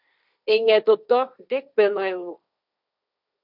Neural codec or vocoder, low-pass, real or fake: codec, 16 kHz, 1.1 kbps, Voila-Tokenizer; 5.4 kHz; fake